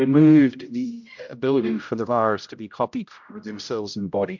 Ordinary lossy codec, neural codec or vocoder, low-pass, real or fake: MP3, 64 kbps; codec, 16 kHz, 0.5 kbps, X-Codec, HuBERT features, trained on general audio; 7.2 kHz; fake